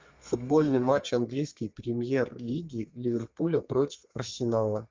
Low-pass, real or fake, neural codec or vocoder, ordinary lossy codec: 7.2 kHz; fake; codec, 32 kHz, 1.9 kbps, SNAC; Opus, 32 kbps